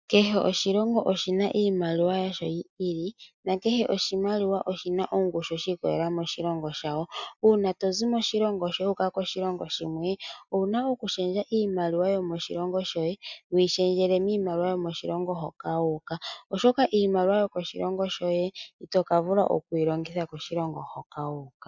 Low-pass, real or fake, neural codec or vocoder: 7.2 kHz; real; none